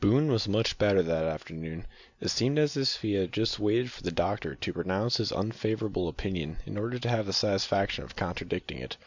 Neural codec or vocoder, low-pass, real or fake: none; 7.2 kHz; real